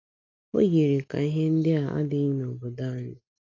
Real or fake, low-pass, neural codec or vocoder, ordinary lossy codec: real; 7.2 kHz; none; none